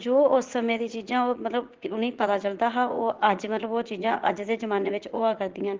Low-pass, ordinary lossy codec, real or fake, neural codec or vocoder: 7.2 kHz; Opus, 16 kbps; fake; vocoder, 44.1 kHz, 80 mel bands, Vocos